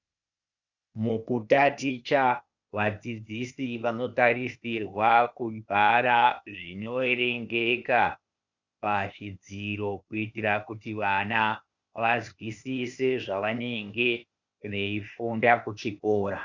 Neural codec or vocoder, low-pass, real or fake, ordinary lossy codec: codec, 16 kHz, 0.8 kbps, ZipCodec; 7.2 kHz; fake; Opus, 64 kbps